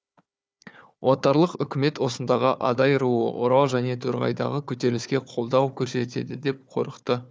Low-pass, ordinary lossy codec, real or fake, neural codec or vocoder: none; none; fake; codec, 16 kHz, 4 kbps, FunCodec, trained on Chinese and English, 50 frames a second